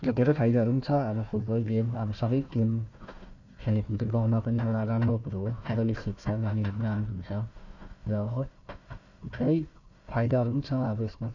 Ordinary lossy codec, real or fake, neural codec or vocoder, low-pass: none; fake; codec, 16 kHz, 1 kbps, FunCodec, trained on Chinese and English, 50 frames a second; 7.2 kHz